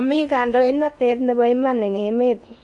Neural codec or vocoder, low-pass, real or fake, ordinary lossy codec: codec, 16 kHz in and 24 kHz out, 0.8 kbps, FocalCodec, streaming, 65536 codes; 10.8 kHz; fake; none